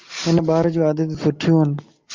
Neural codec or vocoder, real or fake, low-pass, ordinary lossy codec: none; real; 7.2 kHz; Opus, 32 kbps